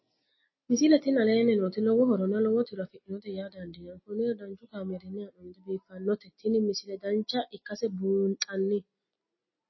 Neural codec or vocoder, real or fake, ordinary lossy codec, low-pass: none; real; MP3, 24 kbps; 7.2 kHz